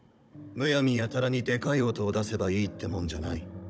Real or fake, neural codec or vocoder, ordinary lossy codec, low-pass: fake; codec, 16 kHz, 16 kbps, FunCodec, trained on Chinese and English, 50 frames a second; none; none